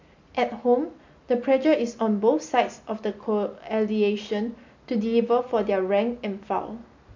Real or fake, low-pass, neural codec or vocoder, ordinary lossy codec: real; 7.2 kHz; none; MP3, 48 kbps